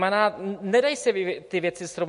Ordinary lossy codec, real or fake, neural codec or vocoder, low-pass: MP3, 48 kbps; real; none; 14.4 kHz